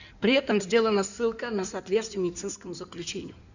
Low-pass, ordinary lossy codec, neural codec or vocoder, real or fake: 7.2 kHz; none; codec, 16 kHz in and 24 kHz out, 2.2 kbps, FireRedTTS-2 codec; fake